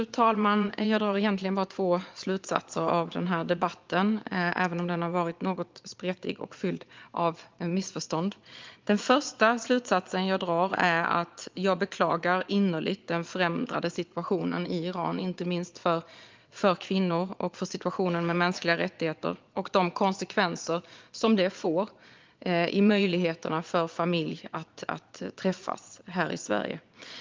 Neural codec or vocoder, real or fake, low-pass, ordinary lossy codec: vocoder, 22.05 kHz, 80 mel bands, Vocos; fake; 7.2 kHz; Opus, 32 kbps